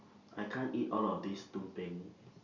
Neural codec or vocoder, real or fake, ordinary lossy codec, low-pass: none; real; Opus, 64 kbps; 7.2 kHz